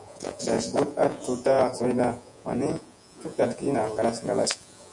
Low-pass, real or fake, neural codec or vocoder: 10.8 kHz; fake; vocoder, 48 kHz, 128 mel bands, Vocos